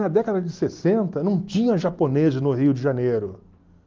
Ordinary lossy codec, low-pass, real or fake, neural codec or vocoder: Opus, 32 kbps; 7.2 kHz; real; none